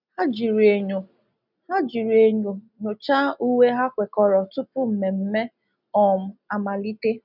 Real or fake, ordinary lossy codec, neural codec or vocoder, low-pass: real; none; none; 5.4 kHz